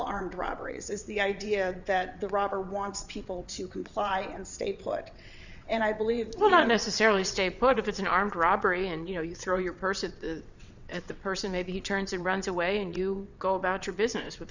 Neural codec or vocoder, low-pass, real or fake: vocoder, 22.05 kHz, 80 mel bands, WaveNeXt; 7.2 kHz; fake